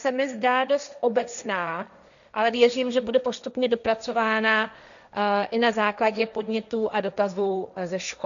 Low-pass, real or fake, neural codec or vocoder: 7.2 kHz; fake; codec, 16 kHz, 1.1 kbps, Voila-Tokenizer